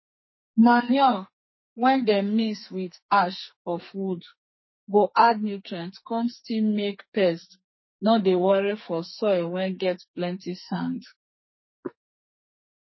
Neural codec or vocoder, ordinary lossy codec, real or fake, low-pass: codec, 44.1 kHz, 2.6 kbps, SNAC; MP3, 24 kbps; fake; 7.2 kHz